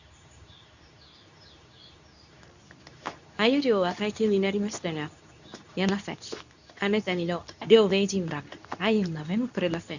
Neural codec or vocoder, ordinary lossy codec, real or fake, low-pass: codec, 24 kHz, 0.9 kbps, WavTokenizer, medium speech release version 2; none; fake; 7.2 kHz